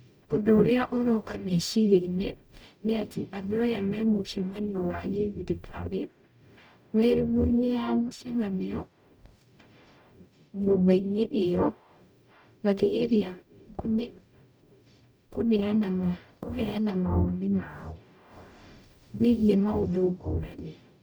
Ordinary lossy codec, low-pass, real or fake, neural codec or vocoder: none; none; fake; codec, 44.1 kHz, 0.9 kbps, DAC